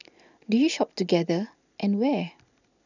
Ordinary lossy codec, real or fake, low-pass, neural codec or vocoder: none; real; 7.2 kHz; none